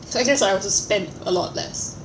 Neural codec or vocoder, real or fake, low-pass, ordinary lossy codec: none; real; none; none